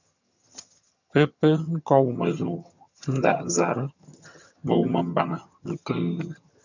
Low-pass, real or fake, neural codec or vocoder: 7.2 kHz; fake; vocoder, 22.05 kHz, 80 mel bands, HiFi-GAN